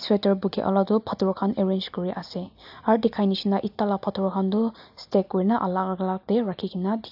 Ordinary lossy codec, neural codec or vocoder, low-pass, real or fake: AAC, 48 kbps; none; 5.4 kHz; real